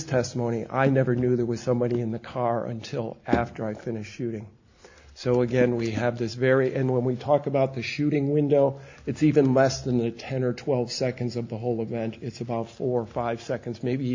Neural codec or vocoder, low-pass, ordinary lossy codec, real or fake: none; 7.2 kHz; AAC, 48 kbps; real